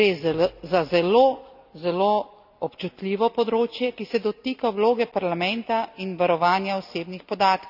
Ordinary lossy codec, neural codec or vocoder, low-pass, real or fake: none; none; 5.4 kHz; real